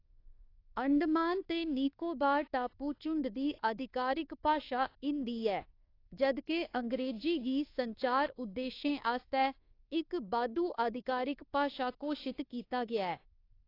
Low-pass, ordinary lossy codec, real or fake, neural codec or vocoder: 5.4 kHz; AAC, 32 kbps; fake; codec, 24 kHz, 1.2 kbps, DualCodec